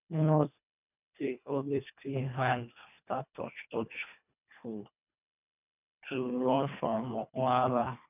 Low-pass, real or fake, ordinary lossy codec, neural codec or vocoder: 3.6 kHz; fake; none; codec, 24 kHz, 1.5 kbps, HILCodec